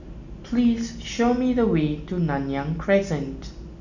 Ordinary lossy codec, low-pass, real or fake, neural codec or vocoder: none; 7.2 kHz; real; none